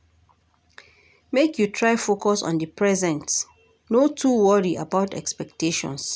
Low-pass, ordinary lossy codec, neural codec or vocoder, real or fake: none; none; none; real